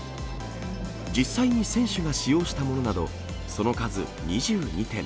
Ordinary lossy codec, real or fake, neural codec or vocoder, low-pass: none; real; none; none